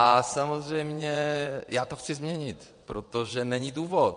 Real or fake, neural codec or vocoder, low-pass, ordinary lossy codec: fake; vocoder, 22.05 kHz, 80 mel bands, WaveNeXt; 9.9 kHz; MP3, 48 kbps